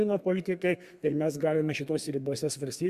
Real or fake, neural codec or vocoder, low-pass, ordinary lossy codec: fake; codec, 32 kHz, 1.9 kbps, SNAC; 14.4 kHz; Opus, 64 kbps